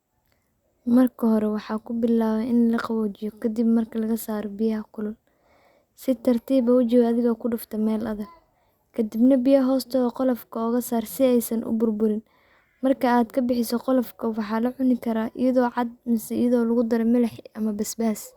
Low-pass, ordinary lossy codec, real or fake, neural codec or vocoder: 19.8 kHz; Opus, 64 kbps; real; none